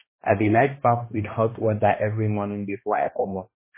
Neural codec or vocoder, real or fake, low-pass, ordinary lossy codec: codec, 16 kHz, 1 kbps, X-Codec, HuBERT features, trained on balanced general audio; fake; 3.6 kHz; MP3, 16 kbps